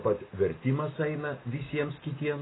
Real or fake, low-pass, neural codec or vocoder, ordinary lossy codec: real; 7.2 kHz; none; AAC, 16 kbps